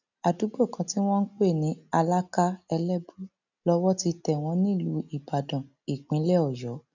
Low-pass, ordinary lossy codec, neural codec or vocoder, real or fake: 7.2 kHz; none; none; real